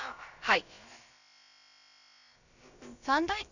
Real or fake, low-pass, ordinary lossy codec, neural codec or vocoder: fake; 7.2 kHz; none; codec, 16 kHz, about 1 kbps, DyCAST, with the encoder's durations